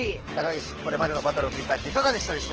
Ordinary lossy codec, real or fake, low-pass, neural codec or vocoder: Opus, 16 kbps; fake; 7.2 kHz; codec, 24 kHz, 6 kbps, HILCodec